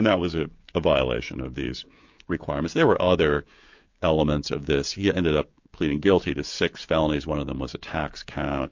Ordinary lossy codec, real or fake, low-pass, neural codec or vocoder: MP3, 48 kbps; fake; 7.2 kHz; codec, 16 kHz, 16 kbps, FreqCodec, smaller model